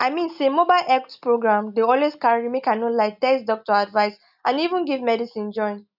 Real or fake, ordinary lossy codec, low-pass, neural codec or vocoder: real; none; 5.4 kHz; none